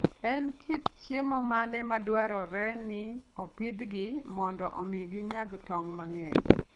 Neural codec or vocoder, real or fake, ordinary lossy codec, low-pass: codec, 24 kHz, 3 kbps, HILCodec; fake; none; 10.8 kHz